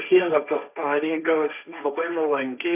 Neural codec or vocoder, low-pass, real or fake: codec, 16 kHz, 1.1 kbps, Voila-Tokenizer; 3.6 kHz; fake